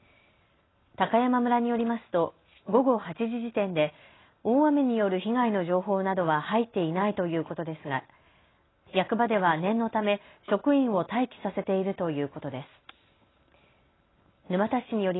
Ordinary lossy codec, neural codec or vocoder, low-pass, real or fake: AAC, 16 kbps; none; 7.2 kHz; real